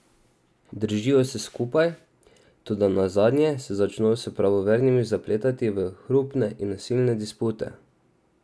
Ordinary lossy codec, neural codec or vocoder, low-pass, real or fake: none; none; none; real